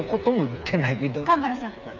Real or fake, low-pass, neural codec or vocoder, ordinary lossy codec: fake; 7.2 kHz; codec, 16 kHz, 4 kbps, FreqCodec, smaller model; none